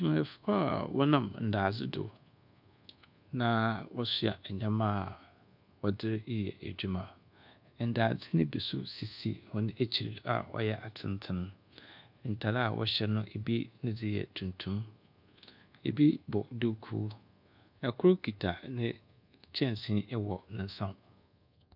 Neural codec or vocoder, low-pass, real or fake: codec, 24 kHz, 1.2 kbps, DualCodec; 5.4 kHz; fake